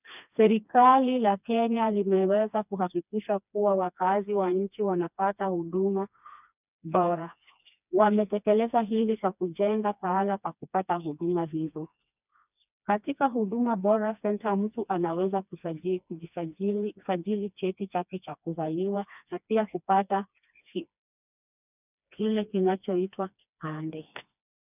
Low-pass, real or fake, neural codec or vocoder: 3.6 kHz; fake; codec, 16 kHz, 2 kbps, FreqCodec, smaller model